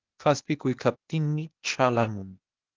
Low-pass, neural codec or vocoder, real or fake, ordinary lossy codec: 7.2 kHz; codec, 16 kHz, 0.8 kbps, ZipCodec; fake; Opus, 24 kbps